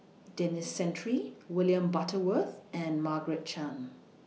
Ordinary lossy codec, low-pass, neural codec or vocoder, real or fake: none; none; none; real